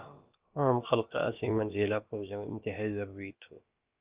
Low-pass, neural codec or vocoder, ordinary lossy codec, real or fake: 3.6 kHz; codec, 16 kHz, about 1 kbps, DyCAST, with the encoder's durations; Opus, 24 kbps; fake